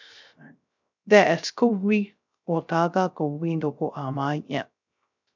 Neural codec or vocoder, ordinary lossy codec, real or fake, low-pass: codec, 16 kHz, 0.3 kbps, FocalCodec; MP3, 64 kbps; fake; 7.2 kHz